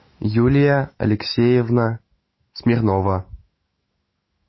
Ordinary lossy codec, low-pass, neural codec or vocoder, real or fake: MP3, 24 kbps; 7.2 kHz; autoencoder, 48 kHz, 128 numbers a frame, DAC-VAE, trained on Japanese speech; fake